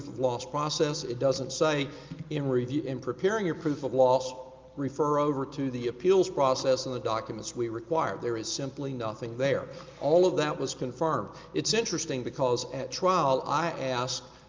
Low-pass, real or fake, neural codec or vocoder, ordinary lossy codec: 7.2 kHz; real; none; Opus, 32 kbps